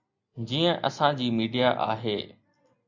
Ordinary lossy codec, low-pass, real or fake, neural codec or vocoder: MP3, 64 kbps; 7.2 kHz; real; none